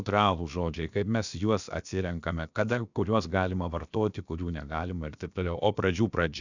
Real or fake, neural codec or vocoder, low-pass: fake; codec, 16 kHz, about 1 kbps, DyCAST, with the encoder's durations; 7.2 kHz